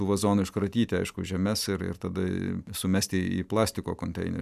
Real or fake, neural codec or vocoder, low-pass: real; none; 14.4 kHz